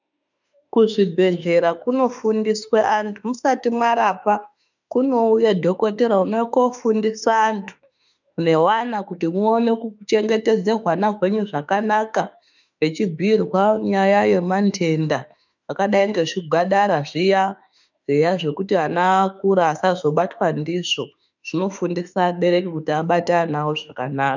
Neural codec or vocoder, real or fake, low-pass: autoencoder, 48 kHz, 32 numbers a frame, DAC-VAE, trained on Japanese speech; fake; 7.2 kHz